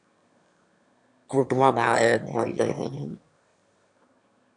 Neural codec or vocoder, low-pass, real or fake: autoencoder, 22.05 kHz, a latent of 192 numbers a frame, VITS, trained on one speaker; 9.9 kHz; fake